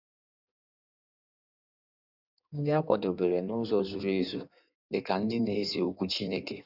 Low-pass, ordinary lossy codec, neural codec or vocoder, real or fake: 5.4 kHz; none; codec, 16 kHz in and 24 kHz out, 1.1 kbps, FireRedTTS-2 codec; fake